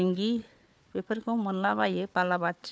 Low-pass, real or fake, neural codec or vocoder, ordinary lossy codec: none; fake; codec, 16 kHz, 4 kbps, FunCodec, trained on Chinese and English, 50 frames a second; none